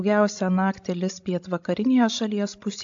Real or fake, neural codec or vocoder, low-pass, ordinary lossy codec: fake; codec, 16 kHz, 16 kbps, FreqCodec, larger model; 7.2 kHz; AAC, 64 kbps